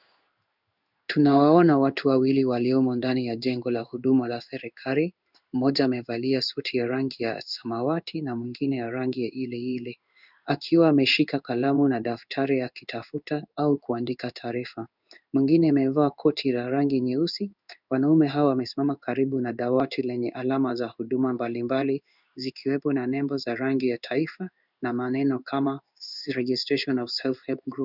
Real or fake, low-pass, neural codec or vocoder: fake; 5.4 kHz; codec, 16 kHz in and 24 kHz out, 1 kbps, XY-Tokenizer